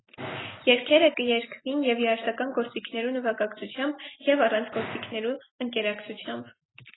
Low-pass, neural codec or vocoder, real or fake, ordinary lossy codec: 7.2 kHz; none; real; AAC, 16 kbps